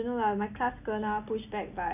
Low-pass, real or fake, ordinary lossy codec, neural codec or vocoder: 3.6 kHz; real; none; none